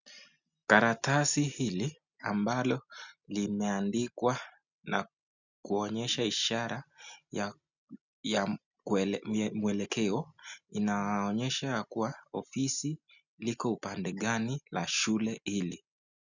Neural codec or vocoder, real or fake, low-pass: none; real; 7.2 kHz